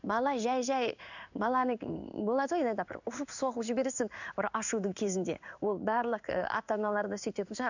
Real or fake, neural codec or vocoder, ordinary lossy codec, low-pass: fake; codec, 16 kHz in and 24 kHz out, 1 kbps, XY-Tokenizer; none; 7.2 kHz